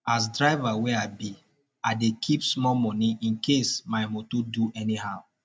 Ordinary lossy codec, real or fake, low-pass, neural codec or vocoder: none; real; none; none